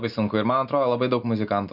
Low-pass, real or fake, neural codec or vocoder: 5.4 kHz; real; none